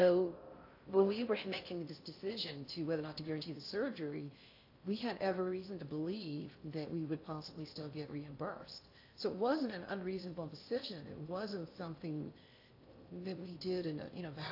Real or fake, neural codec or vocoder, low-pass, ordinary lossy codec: fake; codec, 16 kHz in and 24 kHz out, 0.6 kbps, FocalCodec, streaming, 4096 codes; 5.4 kHz; AAC, 32 kbps